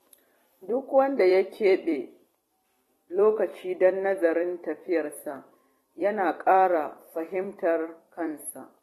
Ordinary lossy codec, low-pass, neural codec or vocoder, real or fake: AAC, 32 kbps; 19.8 kHz; vocoder, 44.1 kHz, 128 mel bands every 256 samples, BigVGAN v2; fake